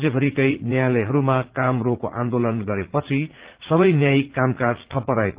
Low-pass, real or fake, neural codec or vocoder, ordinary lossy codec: 3.6 kHz; fake; vocoder, 44.1 kHz, 80 mel bands, Vocos; Opus, 16 kbps